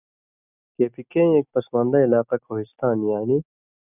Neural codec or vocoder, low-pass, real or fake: none; 3.6 kHz; real